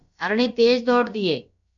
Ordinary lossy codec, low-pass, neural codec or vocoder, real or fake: MP3, 96 kbps; 7.2 kHz; codec, 16 kHz, about 1 kbps, DyCAST, with the encoder's durations; fake